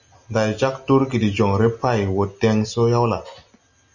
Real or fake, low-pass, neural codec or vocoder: real; 7.2 kHz; none